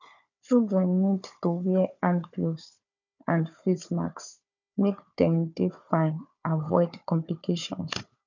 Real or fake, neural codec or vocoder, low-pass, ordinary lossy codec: fake; codec, 16 kHz, 4 kbps, FunCodec, trained on Chinese and English, 50 frames a second; 7.2 kHz; none